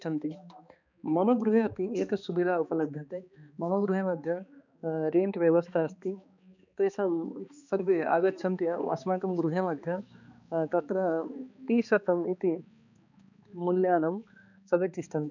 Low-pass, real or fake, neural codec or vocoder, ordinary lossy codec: 7.2 kHz; fake; codec, 16 kHz, 2 kbps, X-Codec, HuBERT features, trained on balanced general audio; none